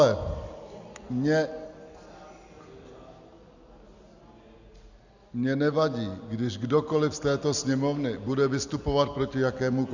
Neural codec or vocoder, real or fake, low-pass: none; real; 7.2 kHz